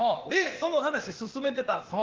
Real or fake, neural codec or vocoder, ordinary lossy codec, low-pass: fake; codec, 16 kHz, 0.8 kbps, ZipCodec; Opus, 16 kbps; 7.2 kHz